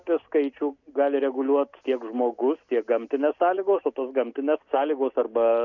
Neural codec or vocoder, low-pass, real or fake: none; 7.2 kHz; real